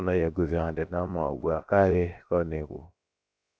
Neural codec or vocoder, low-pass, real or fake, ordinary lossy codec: codec, 16 kHz, 0.7 kbps, FocalCodec; none; fake; none